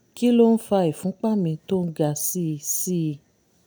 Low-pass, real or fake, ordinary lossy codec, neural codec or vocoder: none; real; none; none